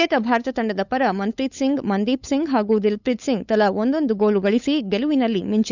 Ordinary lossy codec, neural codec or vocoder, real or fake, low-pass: none; codec, 16 kHz, 8 kbps, FunCodec, trained on LibriTTS, 25 frames a second; fake; 7.2 kHz